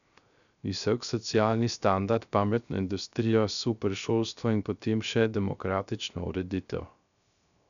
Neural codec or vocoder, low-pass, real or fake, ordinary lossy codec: codec, 16 kHz, 0.3 kbps, FocalCodec; 7.2 kHz; fake; none